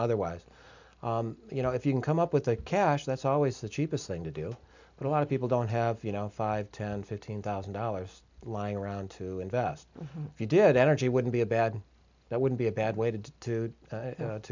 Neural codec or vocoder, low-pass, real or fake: none; 7.2 kHz; real